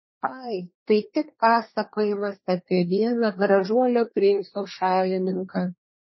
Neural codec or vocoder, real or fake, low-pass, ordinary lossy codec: codec, 24 kHz, 1 kbps, SNAC; fake; 7.2 kHz; MP3, 24 kbps